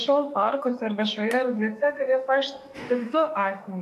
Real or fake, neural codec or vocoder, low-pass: fake; autoencoder, 48 kHz, 32 numbers a frame, DAC-VAE, trained on Japanese speech; 14.4 kHz